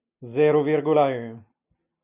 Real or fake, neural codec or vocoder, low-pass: real; none; 3.6 kHz